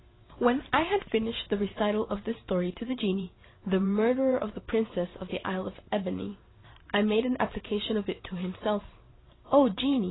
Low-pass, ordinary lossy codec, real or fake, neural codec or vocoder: 7.2 kHz; AAC, 16 kbps; fake; vocoder, 44.1 kHz, 128 mel bands every 512 samples, BigVGAN v2